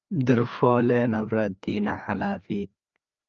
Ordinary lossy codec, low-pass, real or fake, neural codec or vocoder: Opus, 24 kbps; 7.2 kHz; fake; codec, 16 kHz, 2 kbps, FreqCodec, larger model